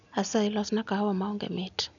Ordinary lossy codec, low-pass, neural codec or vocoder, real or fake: none; 7.2 kHz; none; real